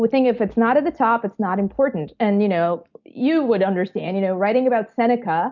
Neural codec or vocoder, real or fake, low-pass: none; real; 7.2 kHz